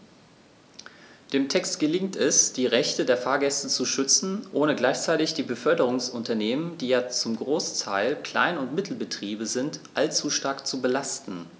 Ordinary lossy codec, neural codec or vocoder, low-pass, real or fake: none; none; none; real